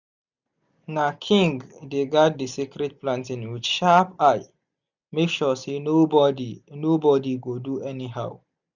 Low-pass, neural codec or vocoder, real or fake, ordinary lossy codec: 7.2 kHz; none; real; none